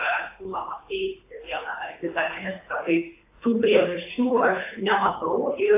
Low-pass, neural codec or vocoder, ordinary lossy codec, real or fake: 3.6 kHz; codec, 24 kHz, 3 kbps, HILCodec; AAC, 24 kbps; fake